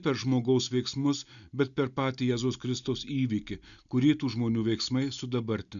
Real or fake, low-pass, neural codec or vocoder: real; 7.2 kHz; none